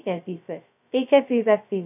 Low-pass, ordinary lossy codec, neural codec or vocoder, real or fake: 3.6 kHz; none; codec, 16 kHz, 0.2 kbps, FocalCodec; fake